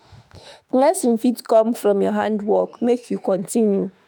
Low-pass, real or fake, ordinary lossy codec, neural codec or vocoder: none; fake; none; autoencoder, 48 kHz, 32 numbers a frame, DAC-VAE, trained on Japanese speech